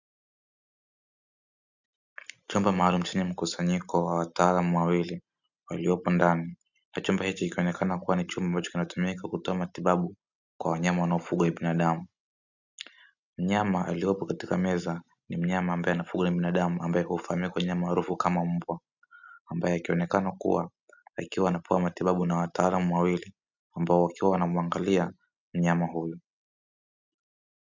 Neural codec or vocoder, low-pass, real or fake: none; 7.2 kHz; real